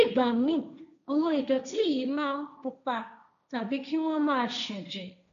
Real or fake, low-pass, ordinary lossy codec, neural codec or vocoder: fake; 7.2 kHz; none; codec, 16 kHz, 1.1 kbps, Voila-Tokenizer